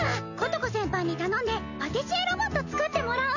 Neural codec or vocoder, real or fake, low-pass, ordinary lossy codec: none; real; 7.2 kHz; none